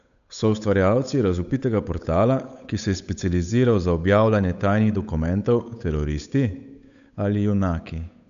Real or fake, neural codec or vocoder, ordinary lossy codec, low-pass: fake; codec, 16 kHz, 8 kbps, FunCodec, trained on Chinese and English, 25 frames a second; none; 7.2 kHz